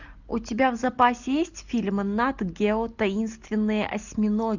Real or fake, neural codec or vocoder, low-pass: real; none; 7.2 kHz